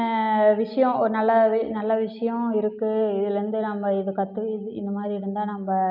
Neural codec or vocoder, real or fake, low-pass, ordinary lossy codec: none; real; 5.4 kHz; none